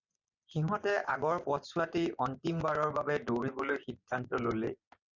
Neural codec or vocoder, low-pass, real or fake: vocoder, 24 kHz, 100 mel bands, Vocos; 7.2 kHz; fake